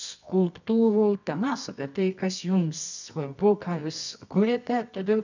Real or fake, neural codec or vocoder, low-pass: fake; codec, 24 kHz, 0.9 kbps, WavTokenizer, medium music audio release; 7.2 kHz